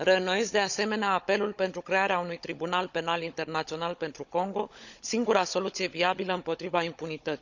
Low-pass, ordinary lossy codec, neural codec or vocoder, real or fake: 7.2 kHz; none; codec, 16 kHz, 16 kbps, FunCodec, trained on Chinese and English, 50 frames a second; fake